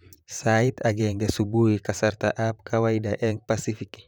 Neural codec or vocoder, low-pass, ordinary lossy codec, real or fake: vocoder, 44.1 kHz, 128 mel bands, Pupu-Vocoder; none; none; fake